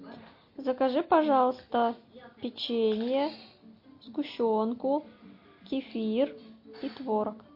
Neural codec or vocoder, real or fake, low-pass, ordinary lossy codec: none; real; 5.4 kHz; MP3, 32 kbps